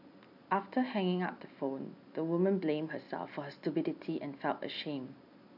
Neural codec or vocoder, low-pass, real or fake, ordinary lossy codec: none; 5.4 kHz; real; none